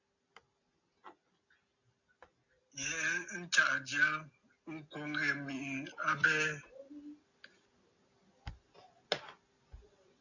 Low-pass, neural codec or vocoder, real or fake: 7.2 kHz; none; real